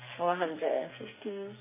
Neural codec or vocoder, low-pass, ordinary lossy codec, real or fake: codec, 44.1 kHz, 2.6 kbps, SNAC; 3.6 kHz; MP3, 16 kbps; fake